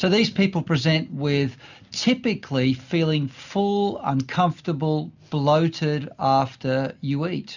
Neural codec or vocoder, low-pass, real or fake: none; 7.2 kHz; real